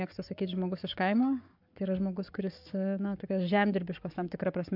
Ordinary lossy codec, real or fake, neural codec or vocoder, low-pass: MP3, 48 kbps; fake; codec, 44.1 kHz, 7.8 kbps, Pupu-Codec; 5.4 kHz